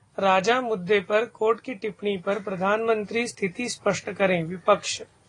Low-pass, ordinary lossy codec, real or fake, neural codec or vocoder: 10.8 kHz; AAC, 32 kbps; real; none